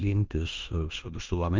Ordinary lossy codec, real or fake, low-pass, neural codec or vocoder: Opus, 24 kbps; fake; 7.2 kHz; codec, 16 kHz in and 24 kHz out, 0.9 kbps, LongCat-Audio-Codec, fine tuned four codebook decoder